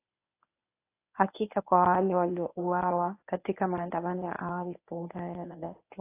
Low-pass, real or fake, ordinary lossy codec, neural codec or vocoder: 3.6 kHz; fake; AAC, 24 kbps; codec, 24 kHz, 0.9 kbps, WavTokenizer, medium speech release version 1